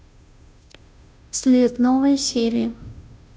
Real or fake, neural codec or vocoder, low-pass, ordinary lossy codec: fake; codec, 16 kHz, 0.5 kbps, FunCodec, trained on Chinese and English, 25 frames a second; none; none